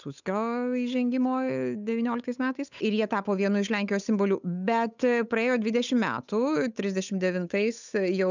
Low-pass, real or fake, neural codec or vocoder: 7.2 kHz; fake; codec, 16 kHz, 8 kbps, FunCodec, trained on Chinese and English, 25 frames a second